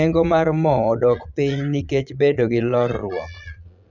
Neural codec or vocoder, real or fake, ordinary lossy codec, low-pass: vocoder, 22.05 kHz, 80 mel bands, Vocos; fake; none; 7.2 kHz